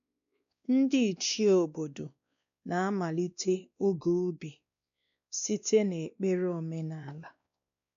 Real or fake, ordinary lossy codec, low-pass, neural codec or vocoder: fake; none; 7.2 kHz; codec, 16 kHz, 2 kbps, X-Codec, WavLM features, trained on Multilingual LibriSpeech